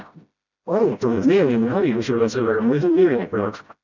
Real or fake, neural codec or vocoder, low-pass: fake; codec, 16 kHz, 0.5 kbps, FreqCodec, smaller model; 7.2 kHz